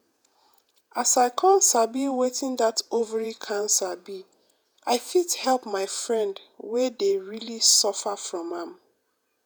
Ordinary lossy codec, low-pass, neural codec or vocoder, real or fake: none; none; vocoder, 48 kHz, 128 mel bands, Vocos; fake